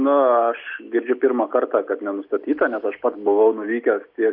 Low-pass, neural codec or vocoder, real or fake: 5.4 kHz; none; real